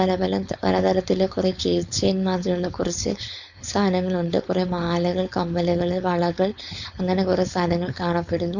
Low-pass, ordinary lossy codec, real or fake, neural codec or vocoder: 7.2 kHz; none; fake; codec, 16 kHz, 4.8 kbps, FACodec